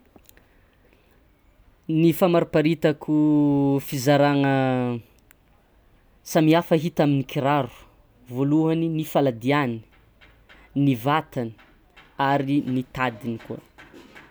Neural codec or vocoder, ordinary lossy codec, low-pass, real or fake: none; none; none; real